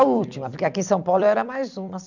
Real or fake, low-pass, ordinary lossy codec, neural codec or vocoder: fake; 7.2 kHz; none; vocoder, 44.1 kHz, 128 mel bands every 256 samples, BigVGAN v2